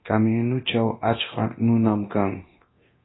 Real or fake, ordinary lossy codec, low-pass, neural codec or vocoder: fake; AAC, 16 kbps; 7.2 kHz; codec, 24 kHz, 0.9 kbps, DualCodec